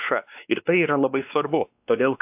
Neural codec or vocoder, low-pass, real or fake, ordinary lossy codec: codec, 16 kHz, 2 kbps, X-Codec, WavLM features, trained on Multilingual LibriSpeech; 3.6 kHz; fake; AAC, 32 kbps